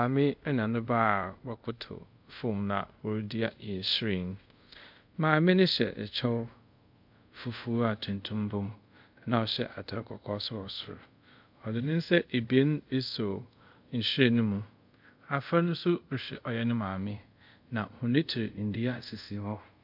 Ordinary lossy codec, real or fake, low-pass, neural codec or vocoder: MP3, 48 kbps; fake; 5.4 kHz; codec, 24 kHz, 0.5 kbps, DualCodec